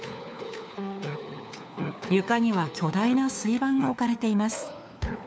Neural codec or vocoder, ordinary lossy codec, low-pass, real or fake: codec, 16 kHz, 4 kbps, FunCodec, trained on LibriTTS, 50 frames a second; none; none; fake